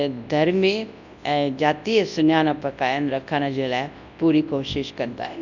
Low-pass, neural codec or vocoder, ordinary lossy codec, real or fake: 7.2 kHz; codec, 24 kHz, 0.9 kbps, WavTokenizer, large speech release; none; fake